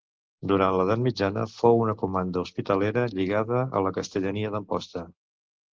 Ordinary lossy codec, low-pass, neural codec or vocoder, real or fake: Opus, 16 kbps; 7.2 kHz; none; real